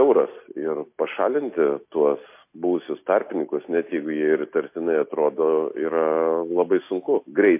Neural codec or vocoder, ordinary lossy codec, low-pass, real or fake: none; MP3, 24 kbps; 3.6 kHz; real